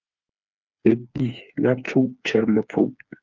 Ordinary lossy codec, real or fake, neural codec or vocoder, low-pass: Opus, 24 kbps; fake; codec, 16 kHz, 4 kbps, FreqCodec, smaller model; 7.2 kHz